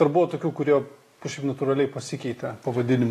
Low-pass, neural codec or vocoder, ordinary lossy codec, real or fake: 14.4 kHz; none; AAC, 48 kbps; real